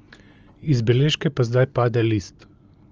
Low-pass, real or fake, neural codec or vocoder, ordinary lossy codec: 7.2 kHz; real; none; Opus, 24 kbps